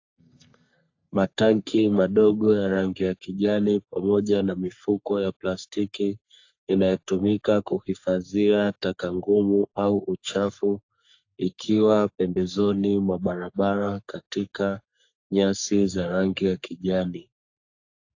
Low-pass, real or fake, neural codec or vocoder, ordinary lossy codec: 7.2 kHz; fake; codec, 44.1 kHz, 3.4 kbps, Pupu-Codec; AAC, 48 kbps